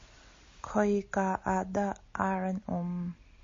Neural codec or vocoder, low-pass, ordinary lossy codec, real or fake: none; 7.2 kHz; MP3, 32 kbps; real